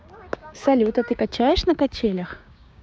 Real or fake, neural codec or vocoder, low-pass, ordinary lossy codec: fake; codec, 16 kHz, 6 kbps, DAC; none; none